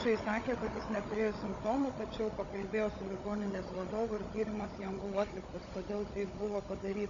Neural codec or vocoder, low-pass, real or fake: codec, 16 kHz, 16 kbps, FunCodec, trained on Chinese and English, 50 frames a second; 7.2 kHz; fake